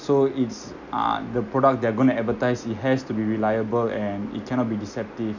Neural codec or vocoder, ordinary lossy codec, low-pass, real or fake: none; none; 7.2 kHz; real